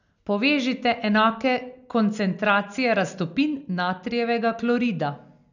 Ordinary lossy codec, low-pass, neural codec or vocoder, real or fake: none; 7.2 kHz; none; real